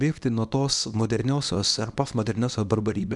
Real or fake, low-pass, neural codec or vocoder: fake; 10.8 kHz; codec, 24 kHz, 0.9 kbps, WavTokenizer, small release